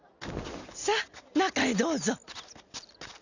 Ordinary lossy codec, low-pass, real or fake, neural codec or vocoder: none; 7.2 kHz; real; none